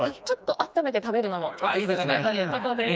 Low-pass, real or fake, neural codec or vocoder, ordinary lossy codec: none; fake; codec, 16 kHz, 2 kbps, FreqCodec, smaller model; none